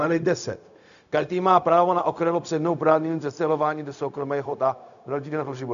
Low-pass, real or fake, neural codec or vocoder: 7.2 kHz; fake; codec, 16 kHz, 0.4 kbps, LongCat-Audio-Codec